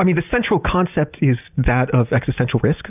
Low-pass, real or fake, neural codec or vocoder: 3.6 kHz; real; none